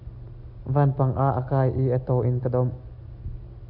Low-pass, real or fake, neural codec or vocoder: 5.4 kHz; real; none